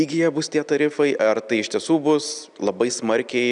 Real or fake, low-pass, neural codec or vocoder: real; 9.9 kHz; none